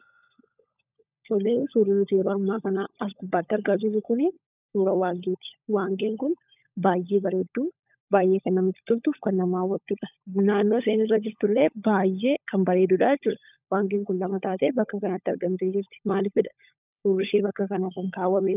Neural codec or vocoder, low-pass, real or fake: codec, 16 kHz, 16 kbps, FunCodec, trained on LibriTTS, 50 frames a second; 3.6 kHz; fake